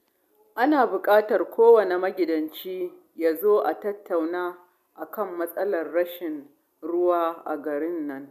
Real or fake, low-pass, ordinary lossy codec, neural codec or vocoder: real; 14.4 kHz; none; none